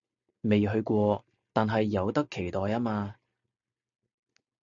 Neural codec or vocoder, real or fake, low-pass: none; real; 7.2 kHz